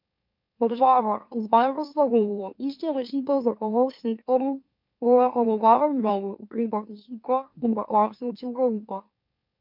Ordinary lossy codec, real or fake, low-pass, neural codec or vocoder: MP3, 48 kbps; fake; 5.4 kHz; autoencoder, 44.1 kHz, a latent of 192 numbers a frame, MeloTTS